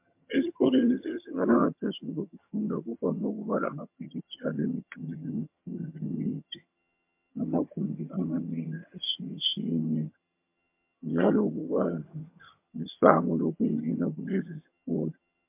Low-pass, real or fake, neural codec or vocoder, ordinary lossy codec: 3.6 kHz; fake; vocoder, 22.05 kHz, 80 mel bands, HiFi-GAN; MP3, 32 kbps